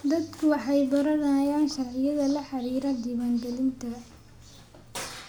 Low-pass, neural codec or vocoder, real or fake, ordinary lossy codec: none; none; real; none